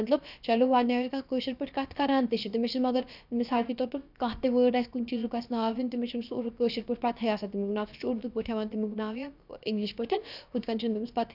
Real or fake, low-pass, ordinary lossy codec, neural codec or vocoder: fake; 5.4 kHz; none; codec, 16 kHz, about 1 kbps, DyCAST, with the encoder's durations